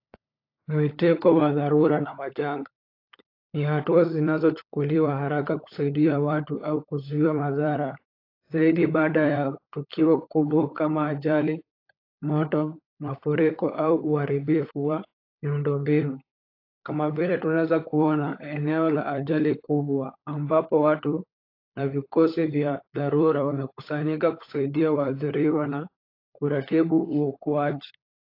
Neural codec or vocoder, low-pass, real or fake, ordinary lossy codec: codec, 16 kHz, 16 kbps, FunCodec, trained on LibriTTS, 50 frames a second; 5.4 kHz; fake; AAC, 32 kbps